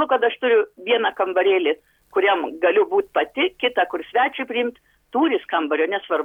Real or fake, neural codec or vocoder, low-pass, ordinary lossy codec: real; none; 19.8 kHz; MP3, 96 kbps